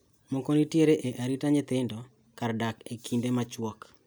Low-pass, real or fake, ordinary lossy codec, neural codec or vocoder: none; real; none; none